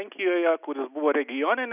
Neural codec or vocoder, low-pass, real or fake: none; 3.6 kHz; real